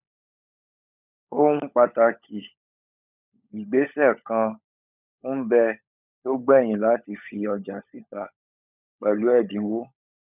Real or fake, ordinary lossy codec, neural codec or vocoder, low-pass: fake; none; codec, 16 kHz, 16 kbps, FunCodec, trained on LibriTTS, 50 frames a second; 3.6 kHz